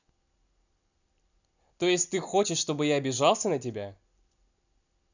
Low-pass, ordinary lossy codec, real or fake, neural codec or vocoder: 7.2 kHz; none; real; none